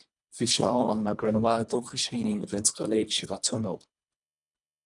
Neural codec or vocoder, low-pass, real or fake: codec, 24 kHz, 1.5 kbps, HILCodec; 10.8 kHz; fake